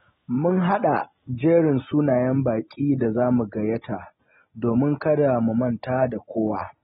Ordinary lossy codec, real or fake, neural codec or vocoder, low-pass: AAC, 16 kbps; real; none; 7.2 kHz